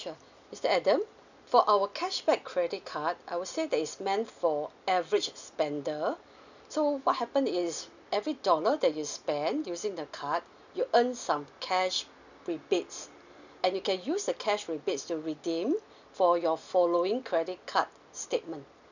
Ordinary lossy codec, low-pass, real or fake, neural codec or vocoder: none; 7.2 kHz; real; none